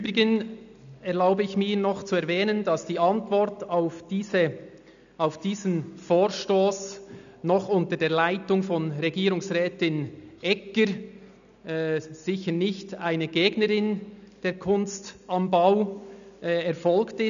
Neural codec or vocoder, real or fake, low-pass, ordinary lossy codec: none; real; 7.2 kHz; none